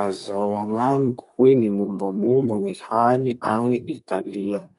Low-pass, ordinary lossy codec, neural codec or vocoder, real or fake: 10.8 kHz; none; codec, 24 kHz, 1 kbps, SNAC; fake